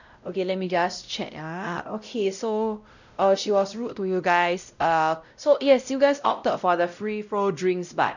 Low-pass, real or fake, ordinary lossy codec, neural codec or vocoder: 7.2 kHz; fake; none; codec, 16 kHz, 0.5 kbps, X-Codec, WavLM features, trained on Multilingual LibriSpeech